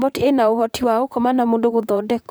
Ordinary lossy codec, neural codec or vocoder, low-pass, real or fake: none; vocoder, 44.1 kHz, 128 mel bands, Pupu-Vocoder; none; fake